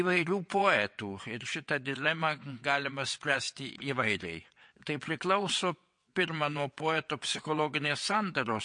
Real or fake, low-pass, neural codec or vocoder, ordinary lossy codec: fake; 9.9 kHz; vocoder, 22.05 kHz, 80 mel bands, WaveNeXt; MP3, 48 kbps